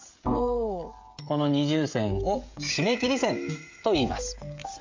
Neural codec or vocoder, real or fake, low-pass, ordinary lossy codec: codec, 16 kHz, 16 kbps, FreqCodec, smaller model; fake; 7.2 kHz; MP3, 48 kbps